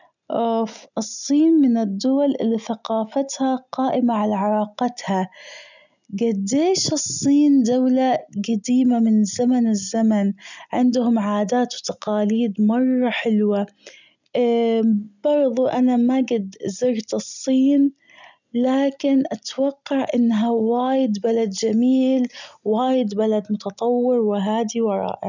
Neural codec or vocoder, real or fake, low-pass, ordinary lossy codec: none; real; 7.2 kHz; none